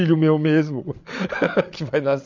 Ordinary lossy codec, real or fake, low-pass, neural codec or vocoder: MP3, 48 kbps; fake; 7.2 kHz; codec, 16 kHz, 8 kbps, FreqCodec, larger model